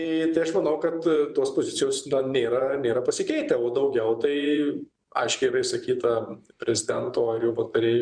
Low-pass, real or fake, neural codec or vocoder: 9.9 kHz; fake; vocoder, 24 kHz, 100 mel bands, Vocos